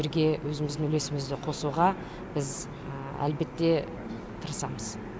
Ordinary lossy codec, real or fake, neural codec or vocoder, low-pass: none; real; none; none